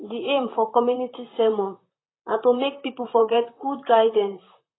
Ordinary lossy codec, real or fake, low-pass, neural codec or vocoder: AAC, 16 kbps; fake; 7.2 kHz; vocoder, 44.1 kHz, 128 mel bands, Pupu-Vocoder